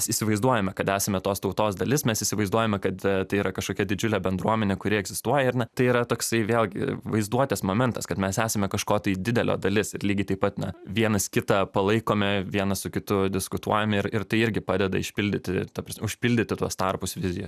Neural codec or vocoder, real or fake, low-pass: none; real; 14.4 kHz